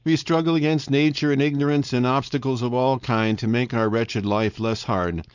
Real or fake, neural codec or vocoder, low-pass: fake; codec, 16 kHz, 4.8 kbps, FACodec; 7.2 kHz